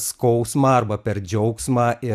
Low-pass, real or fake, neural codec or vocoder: 14.4 kHz; fake; vocoder, 44.1 kHz, 128 mel bands every 256 samples, BigVGAN v2